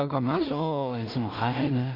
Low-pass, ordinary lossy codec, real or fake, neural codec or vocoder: 5.4 kHz; Opus, 64 kbps; fake; codec, 16 kHz in and 24 kHz out, 0.4 kbps, LongCat-Audio-Codec, two codebook decoder